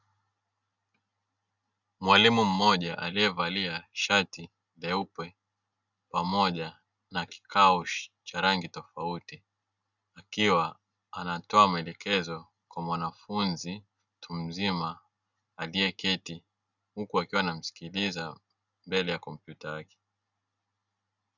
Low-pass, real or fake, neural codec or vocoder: 7.2 kHz; real; none